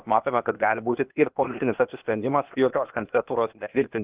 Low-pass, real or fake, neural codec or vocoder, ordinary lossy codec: 3.6 kHz; fake; codec, 16 kHz, 0.8 kbps, ZipCodec; Opus, 32 kbps